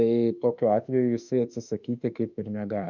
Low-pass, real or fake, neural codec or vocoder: 7.2 kHz; fake; autoencoder, 48 kHz, 32 numbers a frame, DAC-VAE, trained on Japanese speech